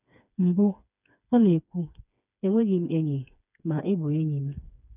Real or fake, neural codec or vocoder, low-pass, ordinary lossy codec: fake; codec, 16 kHz, 4 kbps, FreqCodec, smaller model; 3.6 kHz; none